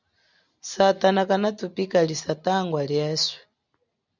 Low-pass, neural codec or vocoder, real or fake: 7.2 kHz; none; real